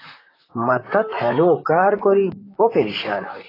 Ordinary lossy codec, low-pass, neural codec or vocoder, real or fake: AAC, 24 kbps; 5.4 kHz; vocoder, 44.1 kHz, 128 mel bands, Pupu-Vocoder; fake